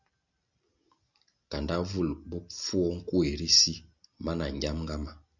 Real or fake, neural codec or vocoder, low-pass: real; none; 7.2 kHz